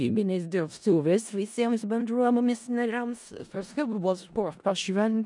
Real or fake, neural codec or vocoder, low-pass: fake; codec, 16 kHz in and 24 kHz out, 0.4 kbps, LongCat-Audio-Codec, four codebook decoder; 10.8 kHz